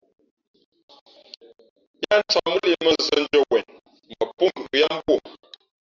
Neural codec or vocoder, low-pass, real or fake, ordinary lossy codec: none; 7.2 kHz; real; Opus, 64 kbps